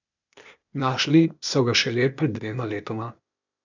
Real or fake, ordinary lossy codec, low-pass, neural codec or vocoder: fake; none; 7.2 kHz; codec, 16 kHz, 0.8 kbps, ZipCodec